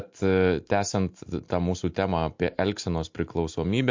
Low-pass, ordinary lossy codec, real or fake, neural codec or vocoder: 7.2 kHz; MP3, 48 kbps; real; none